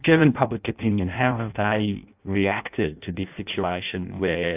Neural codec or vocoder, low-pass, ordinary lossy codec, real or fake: codec, 16 kHz in and 24 kHz out, 0.6 kbps, FireRedTTS-2 codec; 3.6 kHz; AAC, 32 kbps; fake